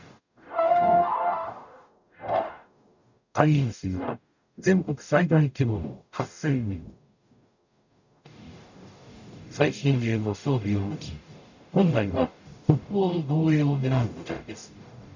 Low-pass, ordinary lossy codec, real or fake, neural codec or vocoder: 7.2 kHz; none; fake; codec, 44.1 kHz, 0.9 kbps, DAC